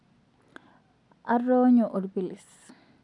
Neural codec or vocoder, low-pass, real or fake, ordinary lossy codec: none; 10.8 kHz; real; none